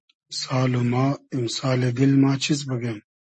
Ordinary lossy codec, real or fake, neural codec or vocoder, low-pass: MP3, 32 kbps; real; none; 10.8 kHz